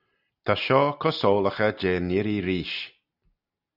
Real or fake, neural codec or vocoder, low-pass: real; none; 5.4 kHz